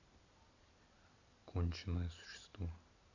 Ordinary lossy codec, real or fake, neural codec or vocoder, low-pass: none; real; none; 7.2 kHz